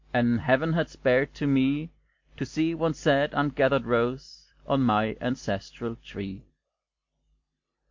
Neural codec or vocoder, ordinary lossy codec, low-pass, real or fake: none; MP3, 48 kbps; 7.2 kHz; real